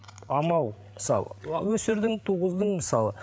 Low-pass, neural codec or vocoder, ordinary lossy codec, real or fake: none; codec, 16 kHz, 8 kbps, FreqCodec, larger model; none; fake